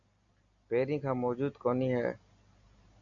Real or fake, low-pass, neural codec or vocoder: real; 7.2 kHz; none